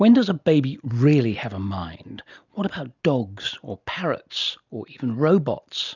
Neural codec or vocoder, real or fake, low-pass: none; real; 7.2 kHz